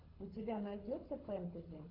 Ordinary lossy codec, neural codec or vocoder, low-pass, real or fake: AAC, 48 kbps; codec, 24 kHz, 6 kbps, HILCodec; 5.4 kHz; fake